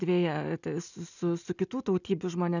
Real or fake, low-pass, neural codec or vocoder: real; 7.2 kHz; none